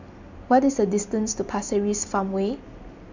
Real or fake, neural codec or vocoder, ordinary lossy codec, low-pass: real; none; none; 7.2 kHz